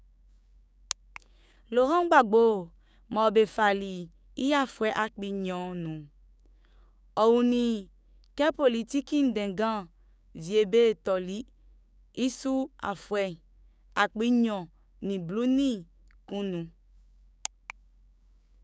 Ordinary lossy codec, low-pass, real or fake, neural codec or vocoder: none; none; fake; codec, 16 kHz, 6 kbps, DAC